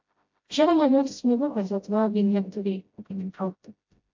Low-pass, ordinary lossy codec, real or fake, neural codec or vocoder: 7.2 kHz; MP3, 48 kbps; fake; codec, 16 kHz, 0.5 kbps, FreqCodec, smaller model